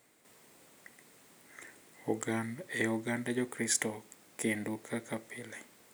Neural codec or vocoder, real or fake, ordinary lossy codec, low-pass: none; real; none; none